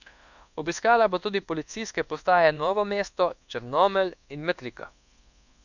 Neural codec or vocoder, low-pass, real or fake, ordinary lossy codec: codec, 24 kHz, 1.2 kbps, DualCodec; 7.2 kHz; fake; none